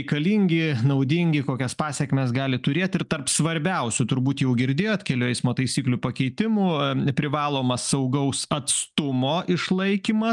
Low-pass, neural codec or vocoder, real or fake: 10.8 kHz; none; real